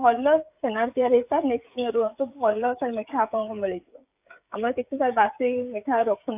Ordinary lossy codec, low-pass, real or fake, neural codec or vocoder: AAC, 32 kbps; 3.6 kHz; fake; codec, 16 kHz, 16 kbps, FreqCodec, smaller model